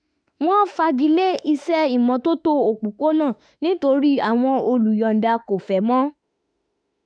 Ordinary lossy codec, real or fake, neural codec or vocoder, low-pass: none; fake; autoencoder, 48 kHz, 32 numbers a frame, DAC-VAE, trained on Japanese speech; 9.9 kHz